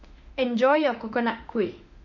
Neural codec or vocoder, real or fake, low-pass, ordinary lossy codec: autoencoder, 48 kHz, 32 numbers a frame, DAC-VAE, trained on Japanese speech; fake; 7.2 kHz; none